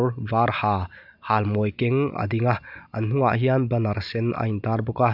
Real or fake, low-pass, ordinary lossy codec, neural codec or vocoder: real; 5.4 kHz; none; none